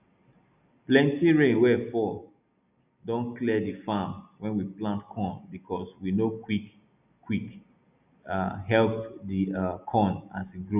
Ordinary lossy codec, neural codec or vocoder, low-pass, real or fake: none; none; 3.6 kHz; real